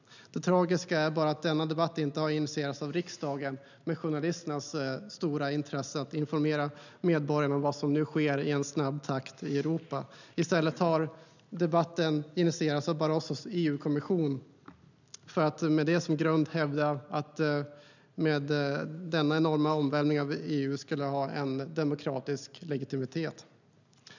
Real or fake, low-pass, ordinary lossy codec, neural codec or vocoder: real; 7.2 kHz; none; none